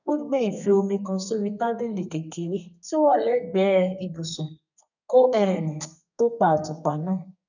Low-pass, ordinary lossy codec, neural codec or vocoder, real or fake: 7.2 kHz; none; codec, 32 kHz, 1.9 kbps, SNAC; fake